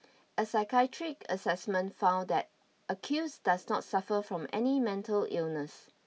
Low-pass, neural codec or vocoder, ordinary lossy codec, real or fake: none; none; none; real